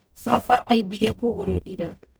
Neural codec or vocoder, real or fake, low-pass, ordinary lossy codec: codec, 44.1 kHz, 0.9 kbps, DAC; fake; none; none